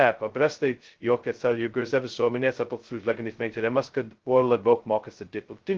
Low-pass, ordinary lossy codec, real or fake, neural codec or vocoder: 7.2 kHz; Opus, 16 kbps; fake; codec, 16 kHz, 0.2 kbps, FocalCodec